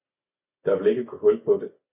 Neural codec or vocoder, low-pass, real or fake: none; 3.6 kHz; real